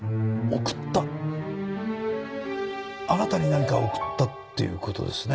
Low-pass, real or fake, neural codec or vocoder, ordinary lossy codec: none; real; none; none